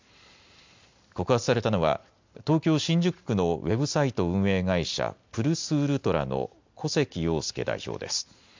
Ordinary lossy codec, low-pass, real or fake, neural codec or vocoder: MP3, 64 kbps; 7.2 kHz; real; none